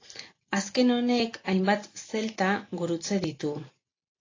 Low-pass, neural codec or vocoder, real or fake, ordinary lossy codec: 7.2 kHz; none; real; AAC, 32 kbps